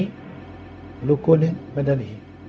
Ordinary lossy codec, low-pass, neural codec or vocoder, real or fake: none; none; codec, 16 kHz, 0.4 kbps, LongCat-Audio-Codec; fake